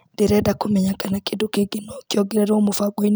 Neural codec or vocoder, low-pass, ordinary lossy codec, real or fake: none; none; none; real